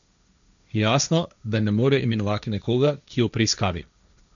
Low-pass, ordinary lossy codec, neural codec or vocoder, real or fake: 7.2 kHz; none; codec, 16 kHz, 1.1 kbps, Voila-Tokenizer; fake